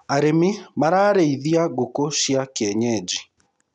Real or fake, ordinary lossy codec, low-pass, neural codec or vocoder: real; none; 10.8 kHz; none